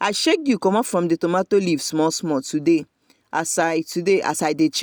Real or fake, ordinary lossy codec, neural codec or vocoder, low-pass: real; none; none; none